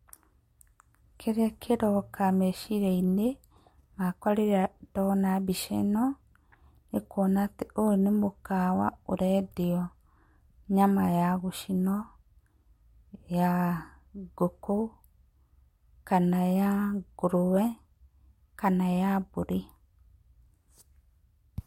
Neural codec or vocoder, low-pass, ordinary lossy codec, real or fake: none; 19.8 kHz; MP3, 64 kbps; real